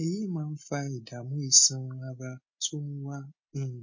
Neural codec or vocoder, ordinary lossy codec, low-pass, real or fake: none; MP3, 32 kbps; 7.2 kHz; real